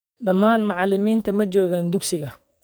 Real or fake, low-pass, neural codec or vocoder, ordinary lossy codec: fake; none; codec, 44.1 kHz, 2.6 kbps, SNAC; none